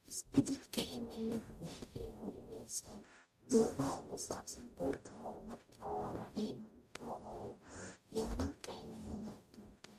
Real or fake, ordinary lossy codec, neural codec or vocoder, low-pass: fake; MP3, 64 kbps; codec, 44.1 kHz, 0.9 kbps, DAC; 14.4 kHz